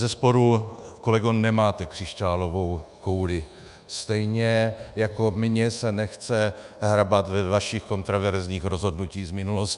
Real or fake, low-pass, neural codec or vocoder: fake; 10.8 kHz; codec, 24 kHz, 1.2 kbps, DualCodec